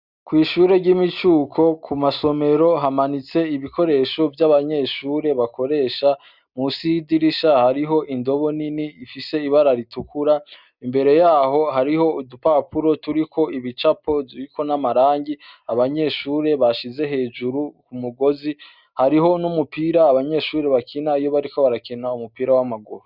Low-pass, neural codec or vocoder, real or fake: 5.4 kHz; none; real